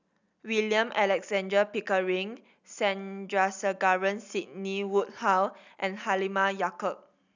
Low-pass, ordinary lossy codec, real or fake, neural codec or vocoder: 7.2 kHz; none; real; none